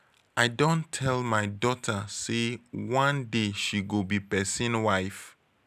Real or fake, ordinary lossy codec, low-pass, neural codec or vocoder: real; none; 14.4 kHz; none